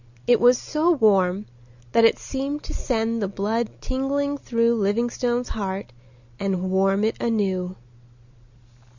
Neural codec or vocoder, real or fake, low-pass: none; real; 7.2 kHz